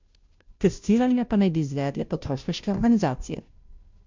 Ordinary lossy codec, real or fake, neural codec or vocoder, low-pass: MP3, 64 kbps; fake; codec, 16 kHz, 0.5 kbps, FunCodec, trained on Chinese and English, 25 frames a second; 7.2 kHz